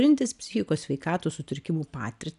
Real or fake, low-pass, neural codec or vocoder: real; 10.8 kHz; none